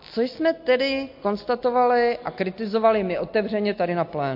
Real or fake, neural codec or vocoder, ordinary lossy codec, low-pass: real; none; MP3, 32 kbps; 5.4 kHz